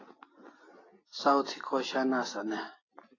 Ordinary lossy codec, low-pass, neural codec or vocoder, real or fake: AAC, 32 kbps; 7.2 kHz; none; real